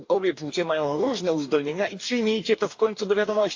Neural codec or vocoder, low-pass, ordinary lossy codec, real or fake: codec, 44.1 kHz, 2.6 kbps, DAC; 7.2 kHz; AAC, 48 kbps; fake